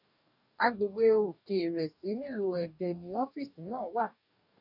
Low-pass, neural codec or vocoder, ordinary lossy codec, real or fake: 5.4 kHz; codec, 44.1 kHz, 2.6 kbps, DAC; none; fake